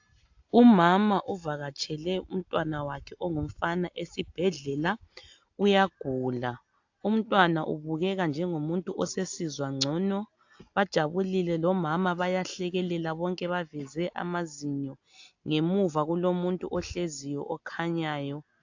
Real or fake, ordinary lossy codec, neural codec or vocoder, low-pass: real; AAC, 48 kbps; none; 7.2 kHz